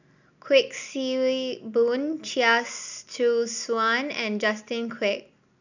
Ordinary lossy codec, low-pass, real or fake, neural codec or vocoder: none; 7.2 kHz; real; none